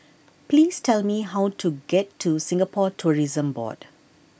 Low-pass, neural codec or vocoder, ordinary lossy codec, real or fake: none; none; none; real